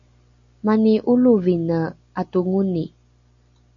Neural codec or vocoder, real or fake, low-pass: none; real; 7.2 kHz